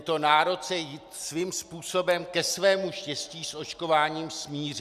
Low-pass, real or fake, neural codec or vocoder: 14.4 kHz; real; none